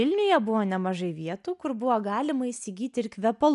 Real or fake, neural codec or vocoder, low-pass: real; none; 10.8 kHz